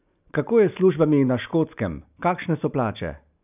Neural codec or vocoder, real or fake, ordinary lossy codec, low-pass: none; real; none; 3.6 kHz